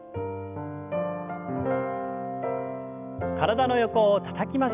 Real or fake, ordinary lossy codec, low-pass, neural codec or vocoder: real; none; 3.6 kHz; none